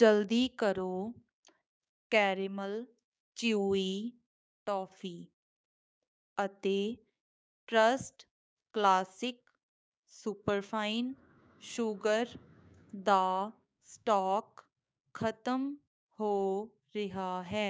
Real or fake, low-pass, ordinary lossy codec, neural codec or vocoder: fake; none; none; codec, 16 kHz, 6 kbps, DAC